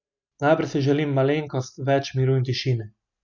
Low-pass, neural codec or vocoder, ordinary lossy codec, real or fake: 7.2 kHz; none; none; real